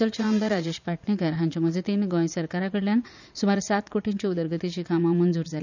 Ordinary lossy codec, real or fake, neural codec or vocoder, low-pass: none; fake; vocoder, 44.1 kHz, 80 mel bands, Vocos; 7.2 kHz